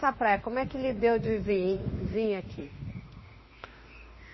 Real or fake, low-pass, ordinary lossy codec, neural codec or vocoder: fake; 7.2 kHz; MP3, 24 kbps; autoencoder, 48 kHz, 32 numbers a frame, DAC-VAE, trained on Japanese speech